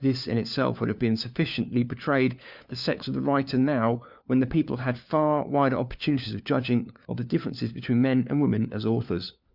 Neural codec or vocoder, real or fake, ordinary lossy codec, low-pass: codec, 16 kHz, 4 kbps, FunCodec, trained on LibriTTS, 50 frames a second; fake; Opus, 64 kbps; 5.4 kHz